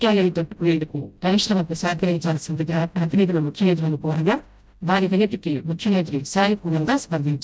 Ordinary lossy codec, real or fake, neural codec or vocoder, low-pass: none; fake; codec, 16 kHz, 0.5 kbps, FreqCodec, smaller model; none